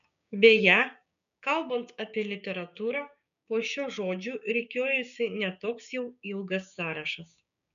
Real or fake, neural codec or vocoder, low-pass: fake; codec, 16 kHz, 6 kbps, DAC; 7.2 kHz